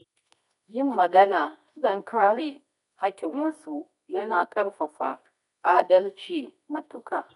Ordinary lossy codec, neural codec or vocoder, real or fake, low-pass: none; codec, 24 kHz, 0.9 kbps, WavTokenizer, medium music audio release; fake; 10.8 kHz